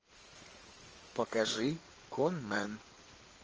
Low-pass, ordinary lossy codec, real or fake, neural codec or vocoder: 7.2 kHz; Opus, 24 kbps; fake; codec, 16 kHz in and 24 kHz out, 2.2 kbps, FireRedTTS-2 codec